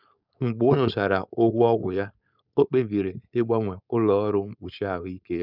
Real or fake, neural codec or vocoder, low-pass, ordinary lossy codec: fake; codec, 16 kHz, 4.8 kbps, FACodec; 5.4 kHz; none